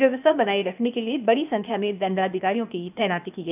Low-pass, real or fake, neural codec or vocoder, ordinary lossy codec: 3.6 kHz; fake; codec, 16 kHz, 0.8 kbps, ZipCodec; none